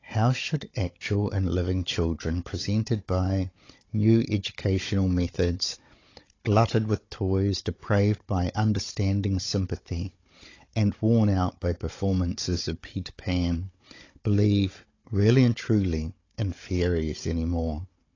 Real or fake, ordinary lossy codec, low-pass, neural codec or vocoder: fake; AAC, 32 kbps; 7.2 kHz; codec, 16 kHz, 16 kbps, FunCodec, trained on Chinese and English, 50 frames a second